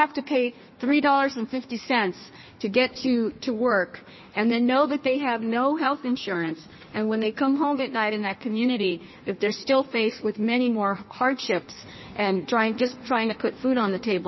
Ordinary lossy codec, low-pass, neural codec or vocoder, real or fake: MP3, 24 kbps; 7.2 kHz; codec, 16 kHz in and 24 kHz out, 1.1 kbps, FireRedTTS-2 codec; fake